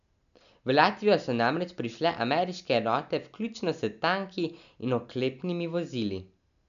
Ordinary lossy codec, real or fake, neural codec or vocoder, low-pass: none; real; none; 7.2 kHz